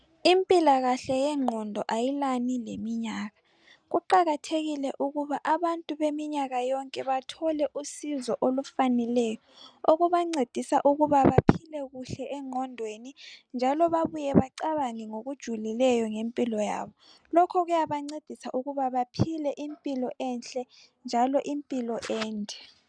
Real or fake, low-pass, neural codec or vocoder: real; 9.9 kHz; none